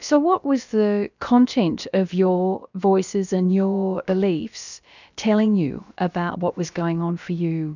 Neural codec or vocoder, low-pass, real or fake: codec, 16 kHz, about 1 kbps, DyCAST, with the encoder's durations; 7.2 kHz; fake